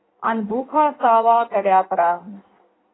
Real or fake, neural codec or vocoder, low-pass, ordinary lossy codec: fake; codec, 16 kHz in and 24 kHz out, 1.1 kbps, FireRedTTS-2 codec; 7.2 kHz; AAC, 16 kbps